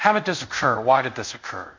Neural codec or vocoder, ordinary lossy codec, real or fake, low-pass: codec, 24 kHz, 0.5 kbps, DualCodec; MP3, 64 kbps; fake; 7.2 kHz